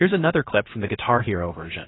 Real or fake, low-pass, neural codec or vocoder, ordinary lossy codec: fake; 7.2 kHz; codec, 16 kHz, about 1 kbps, DyCAST, with the encoder's durations; AAC, 16 kbps